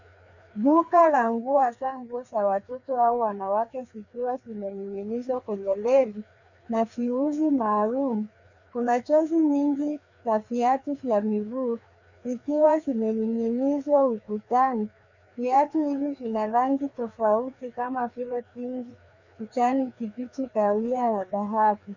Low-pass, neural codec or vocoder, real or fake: 7.2 kHz; codec, 16 kHz, 2 kbps, FreqCodec, larger model; fake